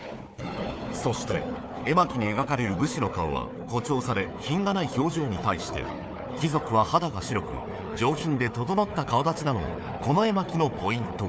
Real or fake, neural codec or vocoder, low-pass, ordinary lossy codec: fake; codec, 16 kHz, 4 kbps, FunCodec, trained on Chinese and English, 50 frames a second; none; none